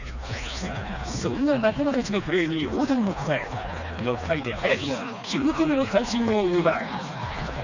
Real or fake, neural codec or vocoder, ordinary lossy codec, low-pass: fake; codec, 16 kHz, 2 kbps, FreqCodec, smaller model; none; 7.2 kHz